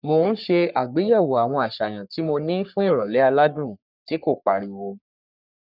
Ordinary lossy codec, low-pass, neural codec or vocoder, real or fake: none; 5.4 kHz; codec, 44.1 kHz, 3.4 kbps, Pupu-Codec; fake